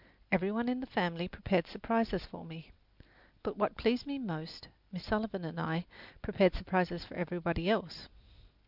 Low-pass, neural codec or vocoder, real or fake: 5.4 kHz; none; real